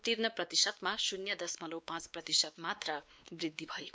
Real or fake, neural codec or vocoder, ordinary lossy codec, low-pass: fake; codec, 16 kHz, 2 kbps, X-Codec, WavLM features, trained on Multilingual LibriSpeech; none; none